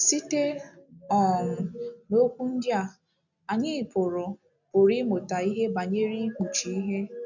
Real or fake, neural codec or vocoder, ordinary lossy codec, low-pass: real; none; none; 7.2 kHz